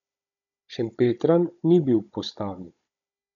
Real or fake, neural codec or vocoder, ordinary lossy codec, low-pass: fake; codec, 16 kHz, 16 kbps, FunCodec, trained on Chinese and English, 50 frames a second; none; 7.2 kHz